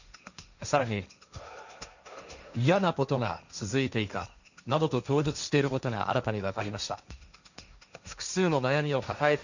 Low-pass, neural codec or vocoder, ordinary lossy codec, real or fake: 7.2 kHz; codec, 16 kHz, 1.1 kbps, Voila-Tokenizer; none; fake